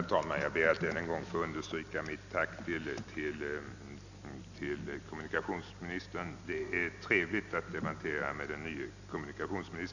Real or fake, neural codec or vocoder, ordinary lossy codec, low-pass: real; none; none; 7.2 kHz